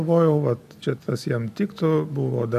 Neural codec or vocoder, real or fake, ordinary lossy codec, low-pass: none; real; AAC, 96 kbps; 14.4 kHz